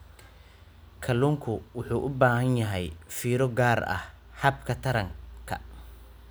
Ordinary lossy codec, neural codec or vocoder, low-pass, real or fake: none; none; none; real